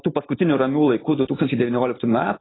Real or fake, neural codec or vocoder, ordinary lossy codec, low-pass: real; none; AAC, 16 kbps; 7.2 kHz